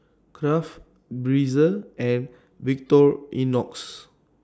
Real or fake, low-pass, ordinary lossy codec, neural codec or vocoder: real; none; none; none